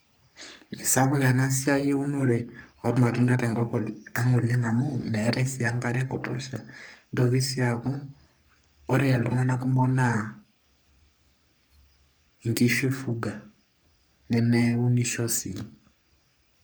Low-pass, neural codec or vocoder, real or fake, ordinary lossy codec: none; codec, 44.1 kHz, 3.4 kbps, Pupu-Codec; fake; none